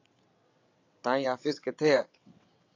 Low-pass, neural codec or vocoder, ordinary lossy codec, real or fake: 7.2 kHz; vocoder, 22.05 kHz, 80 mel bands, WaveNeXt; AAC, 48 kbps; fake